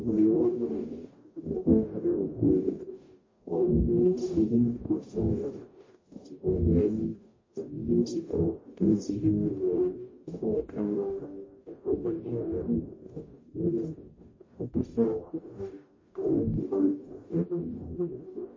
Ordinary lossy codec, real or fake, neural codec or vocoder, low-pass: MP3, 32 kbps; fake; codec, 44.1 kHz, 0.9 kbps, DAC; 7.2 kHz